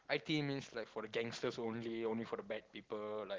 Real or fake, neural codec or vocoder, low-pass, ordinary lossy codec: real; none; 7.2 kHz; Opus, 16 kbps